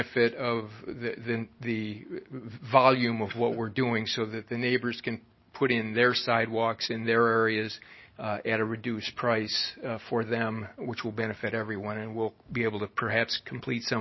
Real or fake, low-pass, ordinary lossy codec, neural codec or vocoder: real; 7.2 kHz; MP3, 24 kbps; none